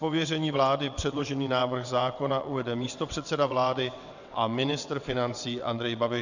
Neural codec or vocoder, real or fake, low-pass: vocoder, 22.05 kHz, 80 mel bands, WaveNeXt; fake; 7.2 kHz